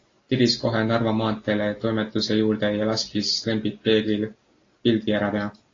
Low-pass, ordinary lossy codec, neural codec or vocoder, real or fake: 7.2 kHz; AAC, 32 kbps; none; real